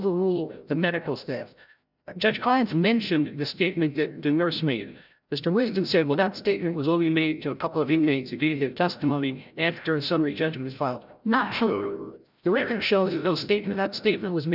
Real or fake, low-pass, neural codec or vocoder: fake; 5.4 kHz; codec, 16 kHz, 0.5 kbps, FreqCodec, larger model